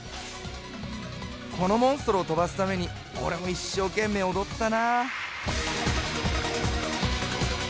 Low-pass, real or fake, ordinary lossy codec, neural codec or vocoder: none; real; none; none